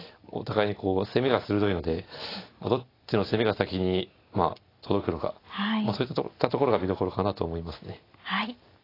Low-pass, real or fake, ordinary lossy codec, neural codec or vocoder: 5.4 kHz; real; AAC, 24 kbps; none